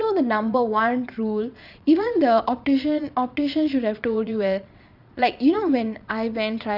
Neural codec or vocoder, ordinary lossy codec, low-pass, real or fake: vocoder, 22.05 kHz, 80 mel bands, Vocos; Opus, 64 kbps; 5.4 kHz; fake